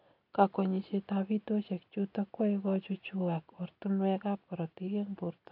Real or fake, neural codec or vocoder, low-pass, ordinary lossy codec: real; none; 5.4 kHz; AAC, 48 kbps